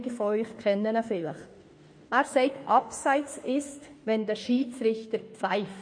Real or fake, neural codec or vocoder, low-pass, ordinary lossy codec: fake; autoencoder, 48 kHz, 32 numbers a frame, DAC-VAE, trained on Japanese speech; 9.9 kHz; MP3, 48 kbps